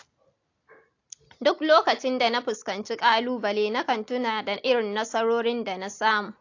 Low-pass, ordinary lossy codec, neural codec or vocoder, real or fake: 7.2 kHz; AAC, 48 kbps; none; real